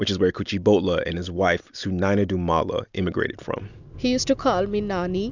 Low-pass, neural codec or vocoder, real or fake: 7.2 kHz; none; real